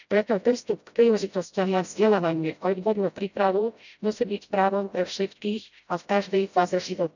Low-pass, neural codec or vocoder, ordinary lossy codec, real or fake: 7.2 kHz; codec, 16 kHz, 0.5 kbps, FreqCodec, smaller model; none; fake